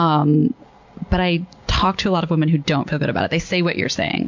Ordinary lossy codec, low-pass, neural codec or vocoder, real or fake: MP3, 48 kbps; 7.2 kHz; none; real